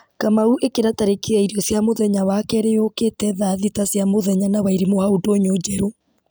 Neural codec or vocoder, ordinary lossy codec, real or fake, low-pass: none; none; real; none